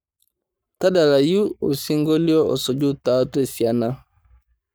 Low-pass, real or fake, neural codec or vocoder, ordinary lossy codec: none; fake; codec, 44.1 kHz, 7.8 kbps, Pupu-Codec; none